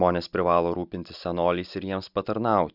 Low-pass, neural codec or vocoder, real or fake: 5.4 kHz; none; real